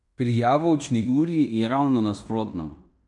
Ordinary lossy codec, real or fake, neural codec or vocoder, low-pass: none; fake; codec, 16 kHz in and 24 kHz out, 0.9 kbps, LongCat-Audio-Codec, fine tuned four codebook decoder; 10.8 kHz